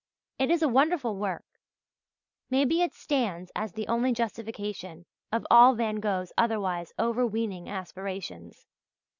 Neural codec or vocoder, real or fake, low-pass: none; real; 7.2 kHz